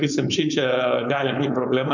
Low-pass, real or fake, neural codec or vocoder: 7.2 kHz; fake; codec, 16 kHz, 4.8 kbps, FACodec